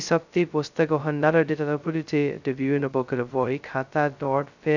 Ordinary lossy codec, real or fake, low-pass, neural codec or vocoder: none; fake; 7.2 kHz; codec, 16 kHz, 0.2 kbps, FocalCodec